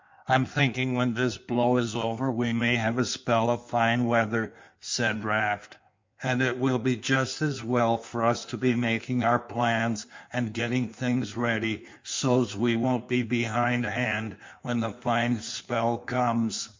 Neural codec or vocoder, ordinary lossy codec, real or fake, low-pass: codec, 16 kHz in and 24 kHz out, 1.1 kbps, FireRedTTS-2 codec; MP3, 64 kbps; fake; 7.2 kHz